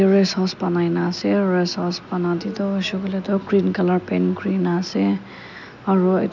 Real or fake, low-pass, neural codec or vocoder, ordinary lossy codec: real; 7.2 kHz; none; none